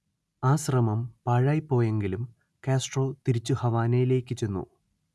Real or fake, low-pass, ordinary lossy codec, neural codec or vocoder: real; none; none; none